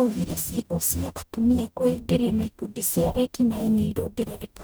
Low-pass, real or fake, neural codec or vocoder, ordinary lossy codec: none; fake; codec, 44.1 kHz, 0.9 kbps, DAC; none